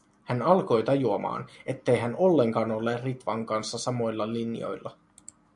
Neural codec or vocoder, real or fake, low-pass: none; real; 10.8 kHz